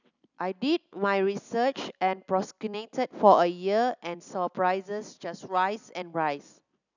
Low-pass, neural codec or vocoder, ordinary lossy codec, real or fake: 7.2 kHz; none; none; real